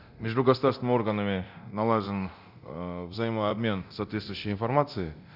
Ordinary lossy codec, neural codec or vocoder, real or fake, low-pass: none; codec, 24 kHz, 0.9 kbps, DualCodec; fake; 5.4 kHz